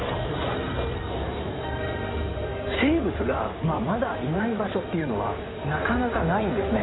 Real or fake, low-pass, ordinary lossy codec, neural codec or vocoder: fake; 7.2 kHz; AAC, 16 kbps; codec, 16 kHz in and 24 kHz out, 2.2 kbps, FireRedTTS-2 codec